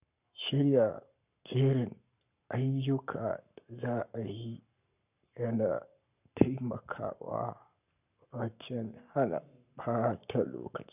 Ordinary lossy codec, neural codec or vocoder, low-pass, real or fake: none; codec, 44.1 kHz, 7.8 kbps, Pupu-Codec; 3.6 kHz; fake